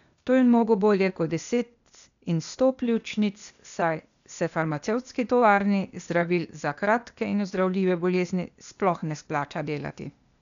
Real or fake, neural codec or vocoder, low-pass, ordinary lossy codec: fake; codec, 16 kHz, 0.8 kbps, ZipCodec; 7.2 kHz; none